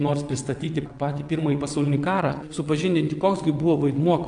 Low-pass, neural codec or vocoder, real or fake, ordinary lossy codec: 9.9 kHz; vocoder, 22.05 kHz, 80 mel bands, WaveNeXt; fake; AAC, 64 kbps